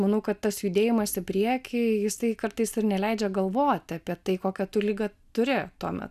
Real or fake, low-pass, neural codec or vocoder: real; 14.4 kHz; none